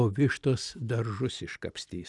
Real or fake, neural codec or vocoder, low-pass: real; none; 10.8 kHz